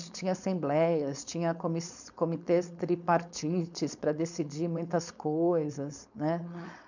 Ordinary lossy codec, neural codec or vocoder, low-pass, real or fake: none; codec, 16 kHz, 4.8 kbps, FACodec; 7.2 kHz; fake